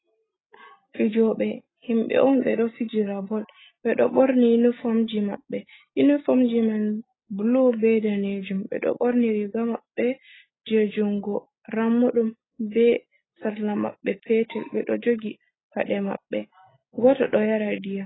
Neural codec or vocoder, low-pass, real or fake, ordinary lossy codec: none; 7.2 kHz; real; AAC, 16 kbps